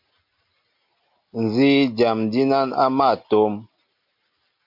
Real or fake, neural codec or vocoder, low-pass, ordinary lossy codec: real; none; 5.4 kHz; MP3, 48 kbps